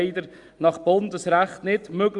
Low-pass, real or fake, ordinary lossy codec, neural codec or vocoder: 10.8 kHz; real; Opus, 64 kbps; none